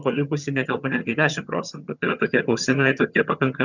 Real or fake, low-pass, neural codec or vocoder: fake; 7.2 kHz; vocoder, 22.05 kHz, 80 mel bands, HiFi-GAN